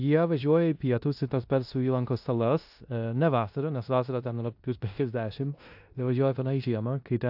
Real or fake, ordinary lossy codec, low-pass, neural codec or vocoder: fake; AAC, 48 kbps; 5.4 kHz; codec, 16 kHz in and 24 kHz out, 0.9 kbps, LongCat-Audio-Codec, four codebook decoder